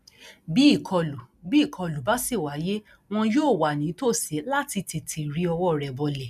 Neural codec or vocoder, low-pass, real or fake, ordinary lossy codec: none; 14.4 kHz; real; none